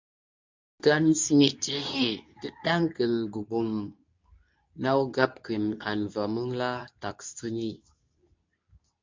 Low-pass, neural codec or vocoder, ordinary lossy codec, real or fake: 7.2 kHz; codec, 24 kHz, 0.9 kbps, WavTokenizer, medium speech release version 2; MP3, 64 kbps; fake